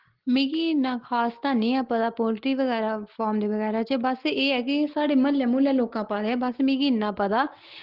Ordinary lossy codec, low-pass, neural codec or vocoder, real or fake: Opus, 16 kbps; 5.4 kHz; none; real